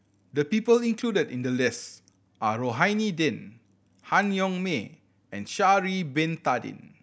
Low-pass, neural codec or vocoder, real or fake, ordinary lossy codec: none; none; real; none